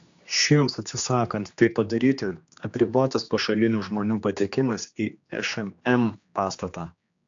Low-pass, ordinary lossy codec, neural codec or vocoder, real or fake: 7.2 kHz; AAC, 64 kbps; codec, 16 kHz, 2 kbps, X-Codec, HuBERT features, trained on general audio; fake